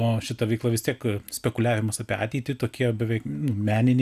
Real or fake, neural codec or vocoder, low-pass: real; none; 14.4 kHz